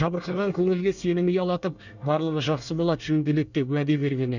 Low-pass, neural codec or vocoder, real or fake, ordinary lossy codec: 7.2 kHz; codec, 24 kHz, 1 kbps, SNAC; fake; none